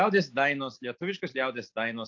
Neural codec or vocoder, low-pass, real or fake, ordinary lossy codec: none; 7.2 kHz; real; AAC, 48 kbps